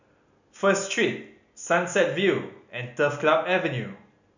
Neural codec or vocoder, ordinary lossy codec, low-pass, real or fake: none; none; 7.2 kHz; real